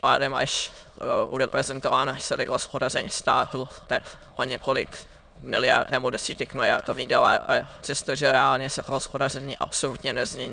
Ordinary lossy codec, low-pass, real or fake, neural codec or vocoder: MP3, 96 kbps; 9.9 kHz; fake; autoencoder, 22.05 kHz, a latent of 192 numbers a frame, VITS, trained on many speakers